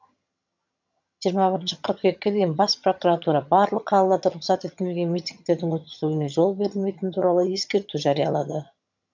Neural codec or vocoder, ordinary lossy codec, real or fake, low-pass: vocoder, 22.05 kHz, 80 mel bands, HiFi-GAN; MP3, 64 kbps; fake; 7.2 kHz